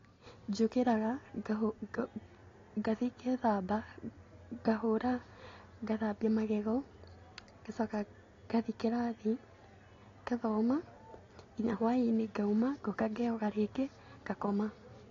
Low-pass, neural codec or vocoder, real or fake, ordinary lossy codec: 7.2 kHz; none; real; AAC, 32 kbps